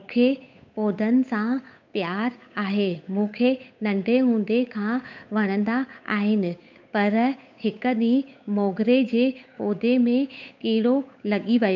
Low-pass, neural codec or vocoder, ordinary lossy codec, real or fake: 7.2 kHz; codec, 16 kHz, 8 kbps, FunCodec, trained on Chinese and English, 25 frames a second; MP3, 64 kbps; fake